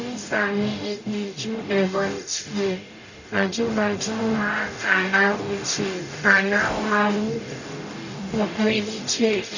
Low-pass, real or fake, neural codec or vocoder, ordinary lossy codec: 7.2 kHz; fake; codec, 44.1 kHz, 0.9 kbps, DAC; none